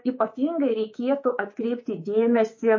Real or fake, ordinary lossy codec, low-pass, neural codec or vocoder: fake; MP3, 32 kbps; 7.2 kHz; codec, 24 kHz, 3.1 kbps, DualCodec